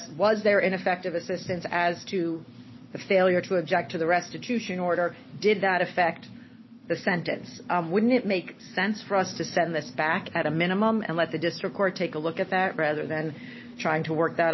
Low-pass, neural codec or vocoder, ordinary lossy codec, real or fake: 7.2 kHz; none; MP3, 24 kbps; real